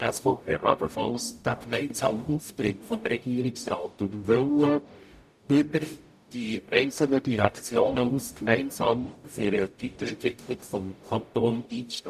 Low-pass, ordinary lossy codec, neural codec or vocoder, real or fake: 14.4 kHz; none; codec, 44.1 kHz, 0.9 kbps, DAC; fake